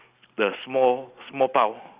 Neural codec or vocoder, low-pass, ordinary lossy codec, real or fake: none; 3.6 kHz; Opus, 64 kbps; real